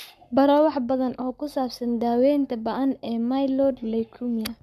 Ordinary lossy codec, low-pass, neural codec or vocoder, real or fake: AAC, 96 kbps; 14.4 kHz; codec, 44.1 kHz, 7.8 kbps, Pupu-Codec; fake